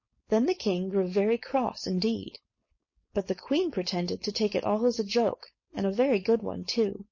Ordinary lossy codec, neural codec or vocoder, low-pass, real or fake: MP3, 32 kbps; codec, 16 kHz, 4.8 kbps, FACodec; 7.2 kHz; fake